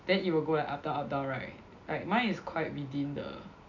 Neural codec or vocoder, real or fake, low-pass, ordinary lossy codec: none; real; 7.2 kHz; none